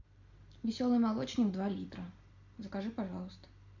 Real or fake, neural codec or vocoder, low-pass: real; none; 7.2 kHz